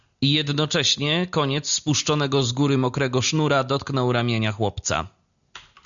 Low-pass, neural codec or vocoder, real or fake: 7.2 kHz; none; real